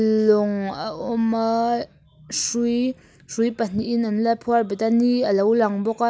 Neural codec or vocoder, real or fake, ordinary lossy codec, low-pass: none; real; none; none